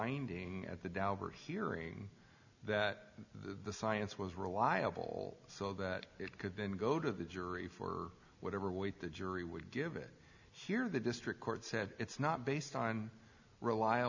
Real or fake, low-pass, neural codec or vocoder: real; 7.2 kHz; none